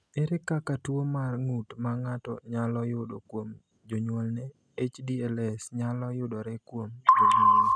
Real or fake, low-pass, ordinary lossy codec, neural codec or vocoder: real; none; none; none